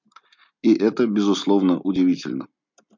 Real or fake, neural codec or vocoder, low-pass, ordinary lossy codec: real; none; 7.2 kHz; MP3, 64 kbps